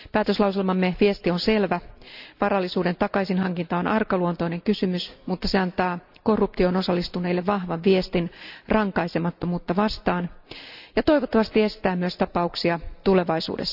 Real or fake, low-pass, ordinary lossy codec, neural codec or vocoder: real; 5.4 kHz; none; none